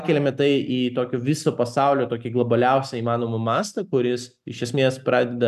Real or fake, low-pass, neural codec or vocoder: real; 14.4 kHz; none